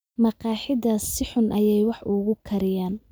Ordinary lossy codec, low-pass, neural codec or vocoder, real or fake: none; none; none; real